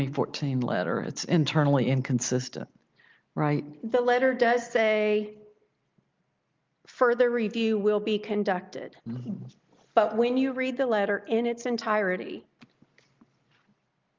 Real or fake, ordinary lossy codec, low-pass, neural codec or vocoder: real; Opus, 24 kbps; 7.2 kHz; none